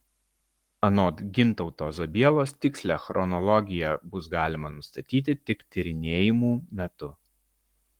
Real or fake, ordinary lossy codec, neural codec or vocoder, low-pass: fake; Opus, 24 kbps; codec, 44.1 kHz, 7.8 kbps, Pupu-Codec; 19.8 kHz